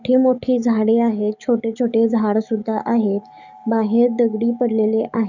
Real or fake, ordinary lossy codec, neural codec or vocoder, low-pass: fake; none; codec, 44.1 kHz, 7.8 kbps, DAC; 7.2 kHz